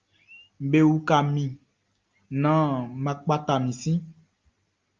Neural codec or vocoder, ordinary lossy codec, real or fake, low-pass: none; Opus, 32 kbps; real; 7.2 kHz